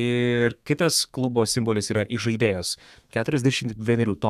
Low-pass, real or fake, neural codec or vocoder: 14.4 kHz; fake; codec, 32 kHz, 1.9 kbps, SNAC